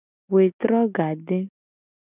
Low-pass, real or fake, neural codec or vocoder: 3.6 kHz; real; none